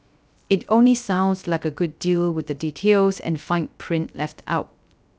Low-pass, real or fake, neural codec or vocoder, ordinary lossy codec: none; fake; codec, 16 kHz, 0.3 kbps, FocalCodec; none